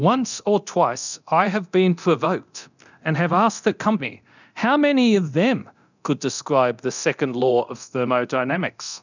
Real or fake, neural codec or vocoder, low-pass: fake; codec, 24 kHz, 0.9 kbps, DualCodec; 7.2 kHz